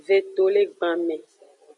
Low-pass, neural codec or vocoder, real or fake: 10.8 kHz; none; real